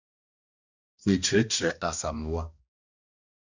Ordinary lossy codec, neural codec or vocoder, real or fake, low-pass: Opus, 64 kbps; codec, 16 kHz, 1 kbps, X-Codec, HuBERT features, trained on balanced general audio; fake; 7.2 kHz